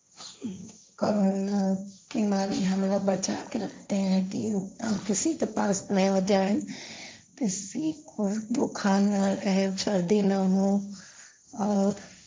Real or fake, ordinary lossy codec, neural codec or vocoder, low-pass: fake; none; codec, 16 kHz, 1.1 kbps, Voila-Tokenizer; none